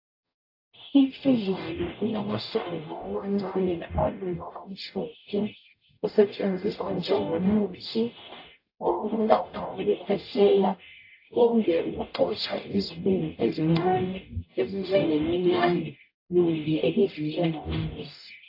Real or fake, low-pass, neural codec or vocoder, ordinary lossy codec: fake; 5.4 kHz; codec, 44.1 kHz, 0.9 kbps, DAC; AAC, 32 kbps